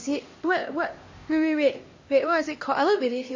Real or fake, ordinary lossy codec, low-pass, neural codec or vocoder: fake; MP3, 32 kbps; 7.2 kHz; codec, 16 kHz, 1 kbps, X-Codec, HuBERT features, trained on LibriSpeech